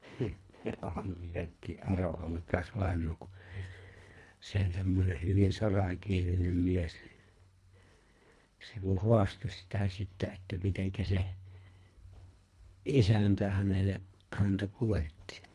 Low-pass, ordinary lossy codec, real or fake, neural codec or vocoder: none; none; fake; codec, 24 kHz, 1.5 kbps, HILCodec